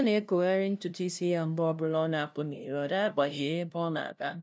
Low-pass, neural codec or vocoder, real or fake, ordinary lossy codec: none; codec, 16 kHz, 0.5 kbps, FunCodec, trained on LibriTTS, 25 frames a second; fake; none